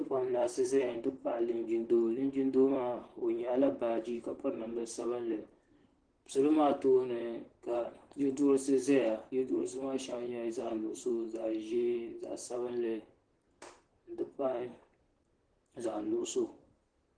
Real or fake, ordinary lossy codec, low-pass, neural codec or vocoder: fake; Opus, 16 kbps; 9.9 kHz; vocoder, 44.1 kHz, 128 mel bands, Pupu-Vocoder